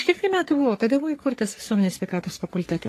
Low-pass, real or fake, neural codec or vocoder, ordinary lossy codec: 14.4 kHz; fake; codec, 44.1 kHz, 3.4 kbps, Pupu-Codec; AAC, 48 kbps